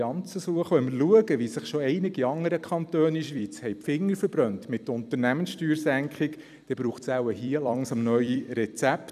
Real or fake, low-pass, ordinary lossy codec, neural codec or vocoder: fake; 14.4 kHz; none; vocoder, 44.1 kHz, 128 mel bands every 256 samples, BigVGAN v2